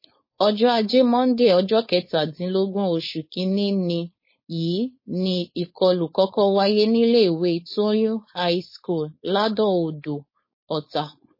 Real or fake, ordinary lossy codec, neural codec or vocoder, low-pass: fake; MP3, 24 kbps; codec, 16 kHz, 4.8 kbps, FACodec; 5.4 kHz